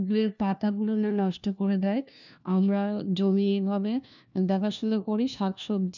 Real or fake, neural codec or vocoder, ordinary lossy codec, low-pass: fake; codec, 16 kHz, 1 kbps, FunCodec, trained on LibriTTS, 50 frames a second; none; 7.2 kHz